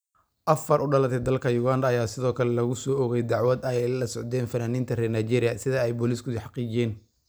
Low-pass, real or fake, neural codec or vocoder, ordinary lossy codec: none; real; none; none